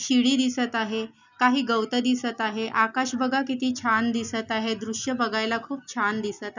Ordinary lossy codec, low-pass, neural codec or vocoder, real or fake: none; 7.2 kHz; none; real